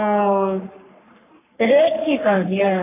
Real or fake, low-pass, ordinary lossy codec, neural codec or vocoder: fake; 3.6 kHz; AAC, 24 kbps; codec, 44.1 kHz, 3.4 kbps, Pupu-Codec